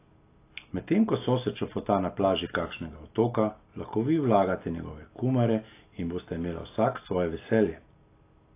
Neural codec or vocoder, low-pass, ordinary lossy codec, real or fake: none; 3.6 kHz; AAC, 24 kbps; real